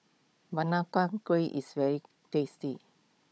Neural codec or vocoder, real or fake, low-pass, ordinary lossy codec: codec, 16 kHz, 16 kbps, FunCodec, trained on Chinese and English, 50 frames a second; fake; none; none